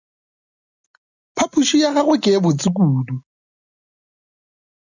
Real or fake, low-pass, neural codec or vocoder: real; 7.2 kHz; none